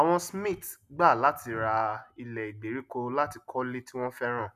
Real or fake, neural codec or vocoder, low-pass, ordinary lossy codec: real; none; 14.4 kHz; none